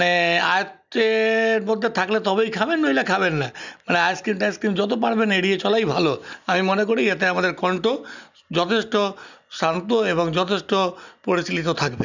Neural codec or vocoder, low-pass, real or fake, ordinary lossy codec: none; 7.2 kHz; real; none